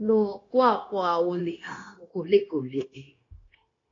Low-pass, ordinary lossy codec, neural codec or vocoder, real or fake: 7.2 kHz; AAC, 32 kbps; codec, 16 kHz, 0.9 kbps, LongCat-Audio-Codec; fake